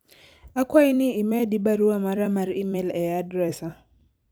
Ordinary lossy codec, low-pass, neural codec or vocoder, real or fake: none; none; vocoder, 44.1 kHz, 128 mel bands every 256 samples, BigVGAN v2; fake